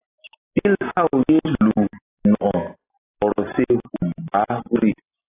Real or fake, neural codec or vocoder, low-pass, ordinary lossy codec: real; none; 3.6 kHz; AAC, 16 kbps